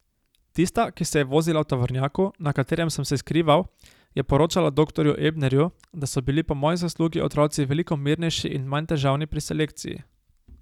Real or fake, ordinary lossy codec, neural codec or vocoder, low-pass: real; none; none; 19.8 kHz